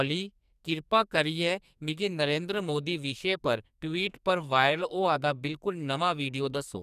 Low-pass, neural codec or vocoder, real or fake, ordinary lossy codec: 14.4 kHz; codec, 44.1 kHz, 2.6 kbps, SNAC; fake; none